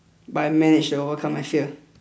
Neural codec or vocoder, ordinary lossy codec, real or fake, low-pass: none; none; real; none